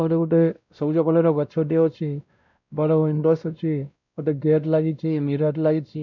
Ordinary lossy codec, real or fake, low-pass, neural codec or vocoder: none; fake; 7.2 kHz; codec, 16 kHz, 0.5 kbps, X-Codec, WavLM features, trained on Multilingual LibriSpeech